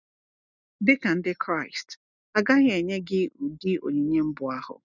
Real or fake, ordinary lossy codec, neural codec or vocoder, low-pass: real; none; none; 7.2 kHz